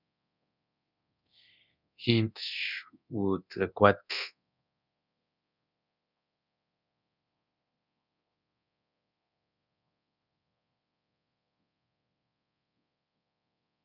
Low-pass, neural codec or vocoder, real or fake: 5.4 kHz; codec, 24 kHz, 0.9 kbps, DualCodec; fake